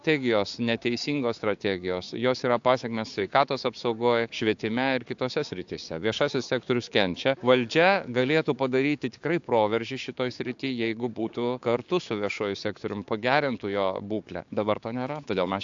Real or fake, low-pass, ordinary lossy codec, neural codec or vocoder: fake; 7.2 kHz; AAC, 64 kbps; codec, 16 kHz, 6 kbps, DAC